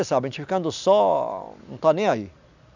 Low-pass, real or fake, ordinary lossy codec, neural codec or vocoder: 7.2 kHz; real; none; none